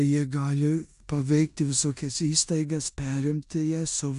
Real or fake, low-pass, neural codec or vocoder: fake; 10.8 kHz; codec, 16 kHz in and 24 kHz out, 0.9 kbps, LongCat-Audio-Codec, four codebook decoder